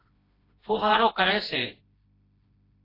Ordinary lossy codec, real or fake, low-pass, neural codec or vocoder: AAC, 24 kbps; fake; 5.4 kHz; codec, 16 kHz, 1 kbps, FreqCodec, smaller model